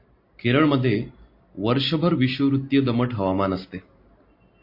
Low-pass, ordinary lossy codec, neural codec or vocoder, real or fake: 5.4 kHz; MP3, 32 kbps; none; real